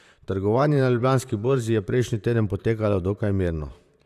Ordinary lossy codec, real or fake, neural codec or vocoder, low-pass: none; fake; vocoder, 44.1 kHz, 128 mel bands, Pupu-Vocoder; 14.4 kHz